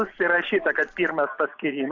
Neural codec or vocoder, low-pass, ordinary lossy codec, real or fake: none; 7.2 kHz; AAC, 48 kbps; real